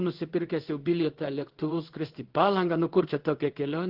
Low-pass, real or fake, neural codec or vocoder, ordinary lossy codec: 5.4 kHz; fake; codec, 16 kHz in and 24 kHz out, 1 kbps, XY-Tokenizer; Opus, 16 kbps